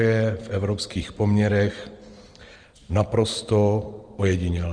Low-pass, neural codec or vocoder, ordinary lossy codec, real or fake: 9.9 kHz; vocoder, 44.1 kHz, 128 mel bands every 512 samples, BigVGAN v2; Opus, 24 kbps; fake